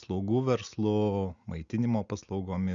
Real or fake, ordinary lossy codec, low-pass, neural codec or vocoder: real; Opus, 64 kbps; 7.2 kHz; none